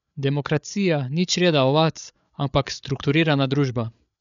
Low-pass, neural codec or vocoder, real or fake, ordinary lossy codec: 7.2 kHz; codec, 16 kHz, 8 kbps, FreqCodec, larger model; fake; none